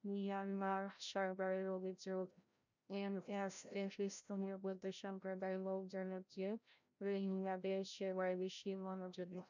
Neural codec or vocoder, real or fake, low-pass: codec, 16 kHz, 0.5 kbps, FreqCodec, larger model; fake; 7.2 kHz